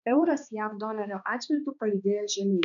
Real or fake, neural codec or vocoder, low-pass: fake; codec, 16 kHz, 2 kbps, X-Codec, HuBERT features, trained on balanced general audio; 7.2 kHz